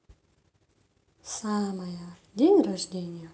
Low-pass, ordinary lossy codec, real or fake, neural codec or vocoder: none; none; real; none